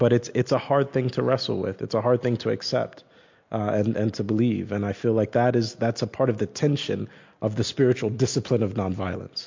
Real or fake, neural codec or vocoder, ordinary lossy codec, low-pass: real; none; MP3, 48 kbps; 7.2 kHz